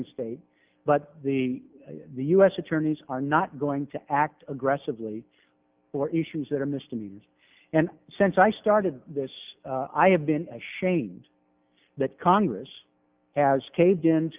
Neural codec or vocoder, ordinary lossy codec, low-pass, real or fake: none; Opus, 32 kbps; 3.6 kHz; real